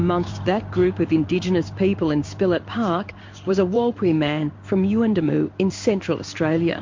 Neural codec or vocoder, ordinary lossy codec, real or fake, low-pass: codec, 16 kHz in and 24 kHz out, 1 kbps, XY-Tokenizer; MP3, 48 kbps; fake; 7.2 kHz